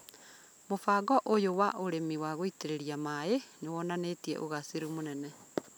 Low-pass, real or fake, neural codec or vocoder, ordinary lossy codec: none; real; none; none